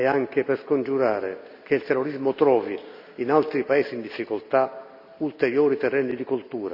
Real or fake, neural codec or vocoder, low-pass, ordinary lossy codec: real; none; 5.4 kHz; none